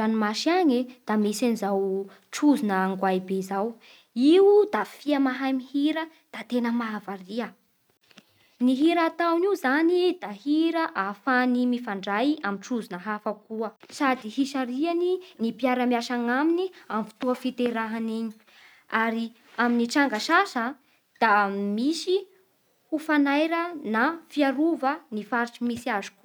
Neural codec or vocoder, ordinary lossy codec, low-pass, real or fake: none; none; none; real